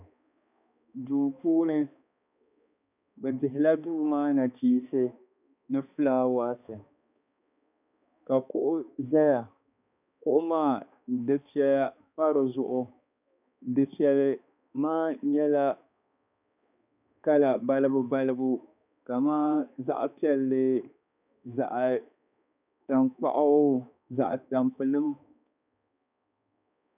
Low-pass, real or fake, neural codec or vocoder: 3.6 kHz; fake; codec, 16 kHz, 2 kbps, X-Codec, HuBERT features, trained on balanced general audio